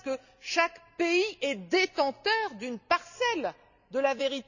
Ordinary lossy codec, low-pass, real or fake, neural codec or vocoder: none; 7.2 kHz; real; none